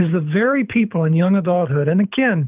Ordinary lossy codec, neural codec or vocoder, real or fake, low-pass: Opus, 32 kbps; codec, 24 kHz, 6 kbps, HILCodec; fake; 3.6 kHz